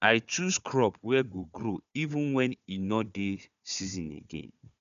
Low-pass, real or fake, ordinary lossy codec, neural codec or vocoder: 7.2 kHz; fake; none; codec, 16 kHz, 4 kbps, FunCodec, trained on Chinese and English, 50 frames a second